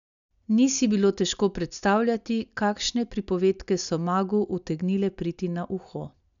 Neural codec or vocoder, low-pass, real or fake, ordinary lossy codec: none; 7.2 kHz; real; none